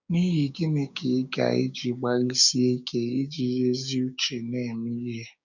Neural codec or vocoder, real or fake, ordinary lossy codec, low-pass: codec, 16 kHz, 6 kbps, DAC; fake; none; 7.2 kHz